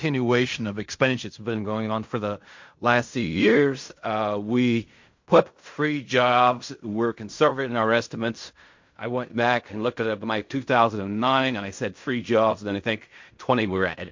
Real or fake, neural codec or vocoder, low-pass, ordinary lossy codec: fake; codec, 16 kHz in and 24 kHz out, 0.4 kbps, LongCat-Audio-Codec, fine tuned four codebook decoder; 7.2 kHz; MP3, 48 kbps